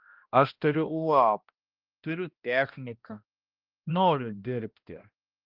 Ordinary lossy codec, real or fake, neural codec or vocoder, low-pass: Opus, 32 kbps; fake; codec, 16 kHz, 1 kbps, X-Codec, HuBERT features, trained on balanced general audio; 5.4 kHz